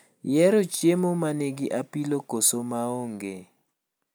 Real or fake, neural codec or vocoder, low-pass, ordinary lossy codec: real; none; none; none